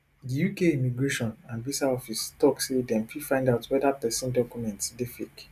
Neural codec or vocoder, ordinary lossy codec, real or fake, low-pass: none; none; real; 14.4 kHz